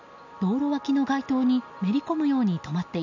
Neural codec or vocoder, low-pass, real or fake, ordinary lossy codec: none; 7.2 kHz; real; none